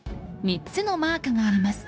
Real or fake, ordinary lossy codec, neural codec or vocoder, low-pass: fake; none; codec, 16 kHz, 0.9 kbps, LongCat-Audio-Codec; none